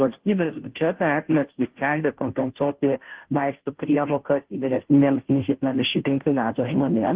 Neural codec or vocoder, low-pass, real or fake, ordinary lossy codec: codec, 16 kHz, 0.5 kbps, FunCodec, trained on Chinese and English, 25 frames a second; 3.6 kHz; fake; Opus, 16 kbps